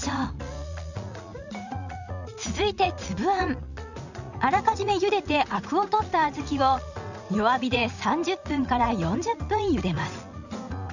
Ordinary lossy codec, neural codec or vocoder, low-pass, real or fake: none; vocoder, 44.1 kHz, 80 mel bands, Vocos; 7.2 kHz; fake